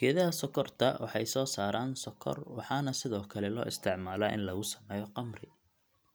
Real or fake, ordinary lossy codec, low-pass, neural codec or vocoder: real; none; none; none